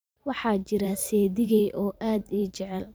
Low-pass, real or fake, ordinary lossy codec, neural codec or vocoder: none; fake; none; vocoder, 44.1 kHz, 128 mel bands every 512 samples, BigVGAN v2